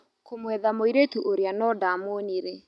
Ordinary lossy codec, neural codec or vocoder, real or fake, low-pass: none; none; real; none